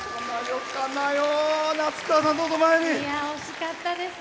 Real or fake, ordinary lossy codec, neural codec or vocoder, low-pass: real; none; none; none